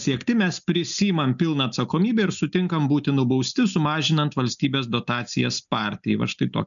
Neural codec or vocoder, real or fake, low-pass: none; real; 7.2 kHz